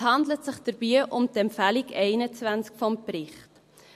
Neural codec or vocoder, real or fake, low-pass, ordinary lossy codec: none; real; 14.4 kHz; MP3, 64 kbps